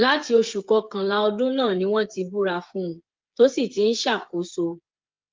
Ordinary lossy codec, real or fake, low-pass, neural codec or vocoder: Opus, 32 kbps; fake; 7.2 kHz; codec, 16 kHz, 8 kbps, FreqCodec, smaller model